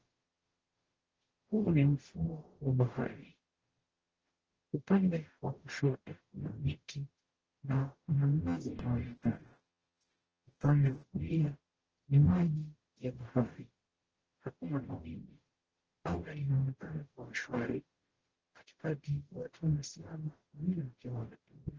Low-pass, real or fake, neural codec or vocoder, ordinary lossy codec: 7.2 kHz; fake; codec, 44.1 kHz, 0.9 kbps, DAC; Opus, 16 kbps